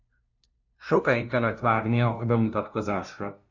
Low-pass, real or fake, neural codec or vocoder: 7.2 kHz; fake; codec, 16 kHz, 0.5 kbps, FunCodec, trained on LibriTTS, 25 frames a second